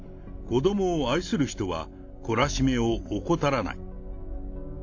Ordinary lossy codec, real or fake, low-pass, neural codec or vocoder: AAC, 48 kbps; real; 7.2 kHz; none